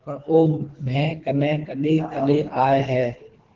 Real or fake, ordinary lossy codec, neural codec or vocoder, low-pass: fake; Opus, 16 kbps; codec, 24 kHz, 3 kbps, HILCodec; 7.2 kHz